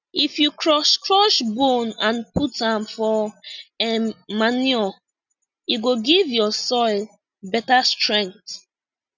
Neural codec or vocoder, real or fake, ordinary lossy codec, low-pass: none; real; none; 7.2 kHz